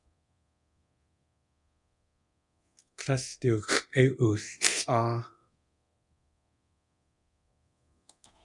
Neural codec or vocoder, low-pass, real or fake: codec, 24 kHz, 0.9 kbps, DualCodec; 10.8 kHz; fake